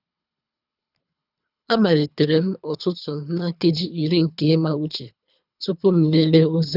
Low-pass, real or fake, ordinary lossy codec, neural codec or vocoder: 5.4 kHz; fake; none; codec, 24 kHz, 3 kbps, HILCodec